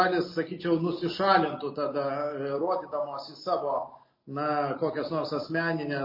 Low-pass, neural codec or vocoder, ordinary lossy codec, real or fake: 5.4 kHz; none; MP3, 24 kbps; real